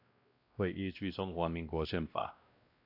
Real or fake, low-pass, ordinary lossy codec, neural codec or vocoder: fake; 5.4 kHz; AAC, 48 kbps; codec, 16 kHz, 1 kbps, X-Codec, WavLM features, trained on Multilingual LibriSpeech